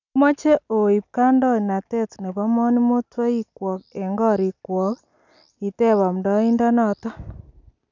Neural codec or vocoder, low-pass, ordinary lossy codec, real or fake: none; 7.2 kHz; none; real